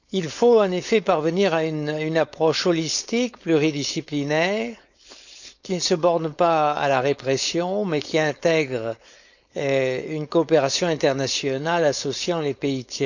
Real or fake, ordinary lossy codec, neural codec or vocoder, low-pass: fake; none; codec, 16 kHz, 4.8 kbps, FACodec; 7.2 kHz